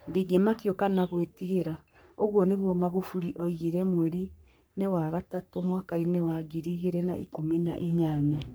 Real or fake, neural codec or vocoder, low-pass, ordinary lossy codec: fake; codec, 44.1 kHz, 3.4 kbps, Pupu-Codec; none; none